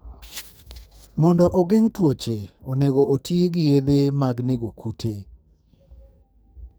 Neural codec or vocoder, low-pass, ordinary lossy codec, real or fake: codec, 44.1 kHz, 2.6 kbps, SNAC; none; none; fake